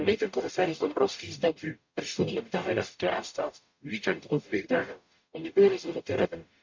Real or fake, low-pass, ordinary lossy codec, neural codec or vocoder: fake; 7.2 kHz; MP3, 48 kbps; codec, 44.1 kHz, 0.9 kbps, DAC